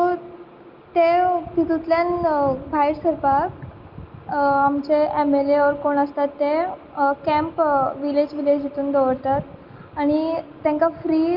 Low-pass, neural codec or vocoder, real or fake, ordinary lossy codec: 5.4 kHz; none; real; Opus, 24 kbps